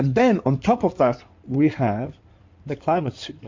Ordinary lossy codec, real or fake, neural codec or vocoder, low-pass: MP3, 48 kbps; fake; codec, 16 kHz in and 24 kHz out, 2.2 kbps, FireRedTTS-2 codec; 7.2 kHz